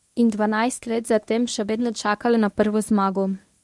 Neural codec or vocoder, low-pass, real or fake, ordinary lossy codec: codec, 24 kHz, 0.9 kbps, WavTokenizer, medium speech release version 1; none; fake; none